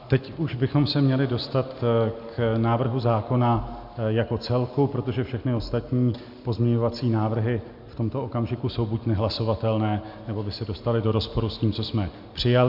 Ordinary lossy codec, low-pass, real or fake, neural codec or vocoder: MP3, 48 kbps; 5.4 kHz; real; none